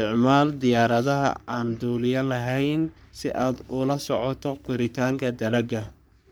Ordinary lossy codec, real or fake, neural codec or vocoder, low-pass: none; fake; codec, 44.1 kHz, 3.4 kbps, Pupu-Codec; none